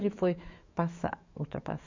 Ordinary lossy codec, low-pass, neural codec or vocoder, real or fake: MP3, 64 kbps; 7.2 kHz; autoencoder, 48 kHz, 128 numbers a frame, DAC-VAE, trained on Japanese speech; fake